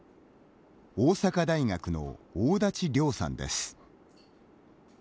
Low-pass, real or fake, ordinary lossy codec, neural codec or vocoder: none; real; none; none